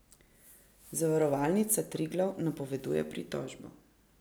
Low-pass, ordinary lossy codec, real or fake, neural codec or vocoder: none; none; real; none